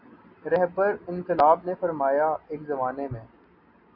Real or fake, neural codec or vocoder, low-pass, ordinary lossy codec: real; none; 5.4 kHz; AAC, 48 kbps